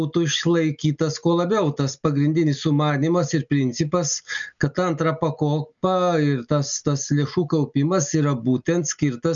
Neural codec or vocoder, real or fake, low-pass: none; real; 7.2 kHz